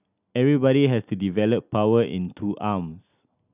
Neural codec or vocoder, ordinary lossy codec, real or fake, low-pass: none; none; real; 3.6 kHz